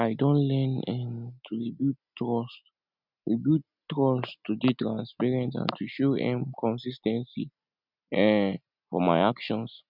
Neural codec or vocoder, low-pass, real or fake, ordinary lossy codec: none; 5.4 kHz; real; none